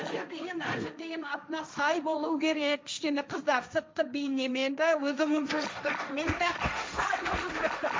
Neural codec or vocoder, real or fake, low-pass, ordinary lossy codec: codec, 16 kHz, 1.1 kbps, Voila-Tokenizer; fake; none; none